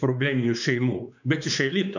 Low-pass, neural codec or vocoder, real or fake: 7.2 kHz; codec, 16 kHz, 4 kbps, X-Codec, WavLM features, trained on Multilingual LibriSpeech; fake